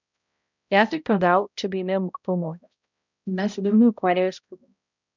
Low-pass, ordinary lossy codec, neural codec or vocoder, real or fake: 7.2 kHz; none; codec, 16 kHz, 0.5 kbps, X-Codec, HuBERT features, trained on balanced general audio; fake